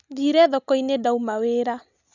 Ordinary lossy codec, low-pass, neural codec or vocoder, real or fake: none; 7.2 kHz; none; real